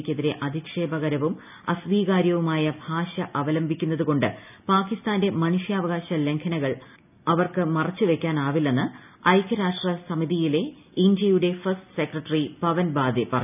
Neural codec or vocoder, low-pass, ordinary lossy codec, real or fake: none; 3.6 kHz; none; real